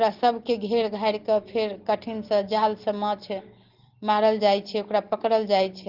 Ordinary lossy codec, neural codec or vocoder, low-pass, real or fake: Opus, 16 kbps; none; 5.4 kHz; real